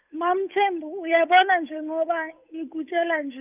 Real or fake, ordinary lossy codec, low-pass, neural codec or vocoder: fake; none; 3.6 kHz; codec, 16 kHz, 8 kbps, FunCodec, trained on Chinese and English, 25 frames a second